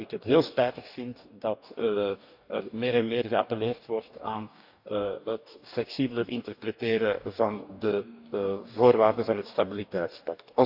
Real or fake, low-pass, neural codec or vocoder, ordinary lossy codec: fake; 5.4 kHz; codec, 44.1 kHz, 2.6 kbps, DAC; none